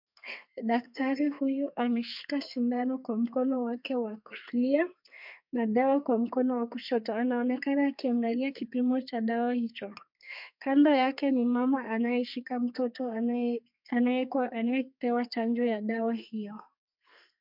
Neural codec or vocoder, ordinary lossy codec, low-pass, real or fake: codec, 32 kHz, 1.9 kbps, SNAC; MP3, 48 kbps; 5.4 kHz; fake